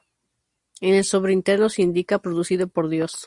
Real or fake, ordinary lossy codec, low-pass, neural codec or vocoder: real; Opus, 64 kbps; 10.8 kHz; none